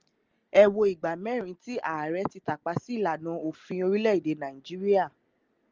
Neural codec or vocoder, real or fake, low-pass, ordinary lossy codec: none; real; 7.2 kHz; Opus, 24 kbps